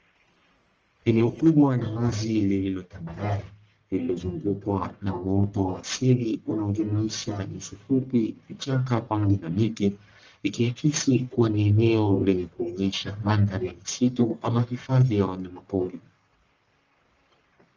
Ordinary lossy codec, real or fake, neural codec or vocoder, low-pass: Opus, 24 kbps; fake; codec, 44.1 kHz, 1.7 kbps, Pupu-Codec; 7.2 kHz